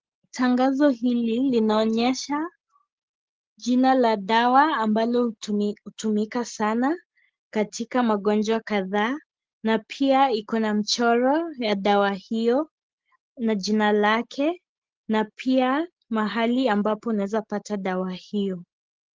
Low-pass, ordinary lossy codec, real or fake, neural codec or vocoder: 7.2 kHz; Opus, 16 kbps; real; none